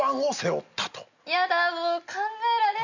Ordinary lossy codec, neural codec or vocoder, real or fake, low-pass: none; none; real; 7.2 kHz